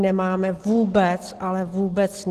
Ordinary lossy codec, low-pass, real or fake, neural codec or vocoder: Opus, 16 kbps; 14.4 kHz; real; none